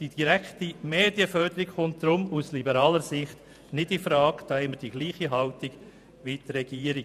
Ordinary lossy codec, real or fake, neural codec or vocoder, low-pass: none; fake; vocoder, 48 kHz, 128 mel bands, Vocos; 14.4 kHz